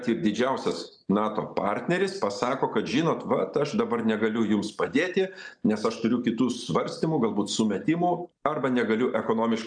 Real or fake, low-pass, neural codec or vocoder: real; 9.9 kHz; none